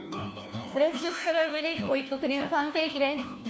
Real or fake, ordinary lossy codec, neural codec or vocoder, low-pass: fake; none; codec, 16 kHz, 1 kbps, FunCodec, trained on LibriTTS, 50 frames a second; none